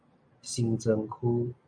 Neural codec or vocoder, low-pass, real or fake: none; 9.9 kHz; real